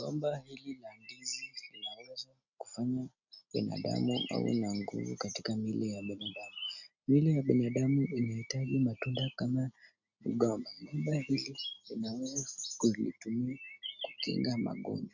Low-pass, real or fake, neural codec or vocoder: 7.2 kHz; real; none